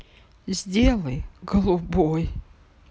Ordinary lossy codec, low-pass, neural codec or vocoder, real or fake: none; none; none; real